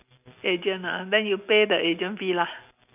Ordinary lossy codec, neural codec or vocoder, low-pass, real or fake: none; none; 3.6 kHz; real